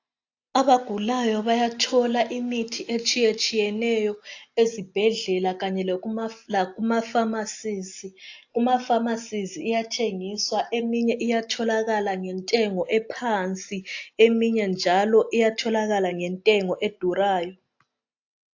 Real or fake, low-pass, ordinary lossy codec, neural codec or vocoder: real; 7.2 kHz; AAC, 48 kbps; none